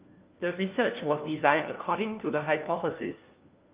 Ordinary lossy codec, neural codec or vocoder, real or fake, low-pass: Opus, 32 kbps; codec, 16 kHz, 1 kbps, FunCodec, trained on LibriTTS, 50 frames a second; fake; 3.6 kHz